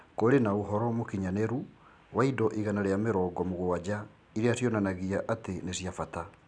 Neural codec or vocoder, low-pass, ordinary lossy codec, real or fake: none; 9.9 kHz; none; real